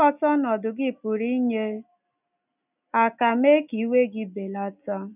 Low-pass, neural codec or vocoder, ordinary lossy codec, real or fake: 3.6 kHz; none; none; real